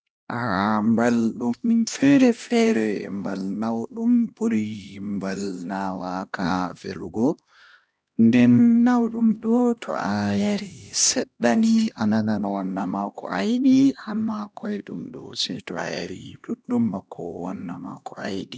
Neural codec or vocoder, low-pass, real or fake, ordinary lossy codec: codec, 16 kHz, 1 kbps, X-Codec, HuBERT features, trained on LibriSpeech; none; fake; none